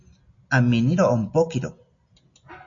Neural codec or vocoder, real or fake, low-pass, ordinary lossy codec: none; real; 7.2 kHz; MP3, 48 kbps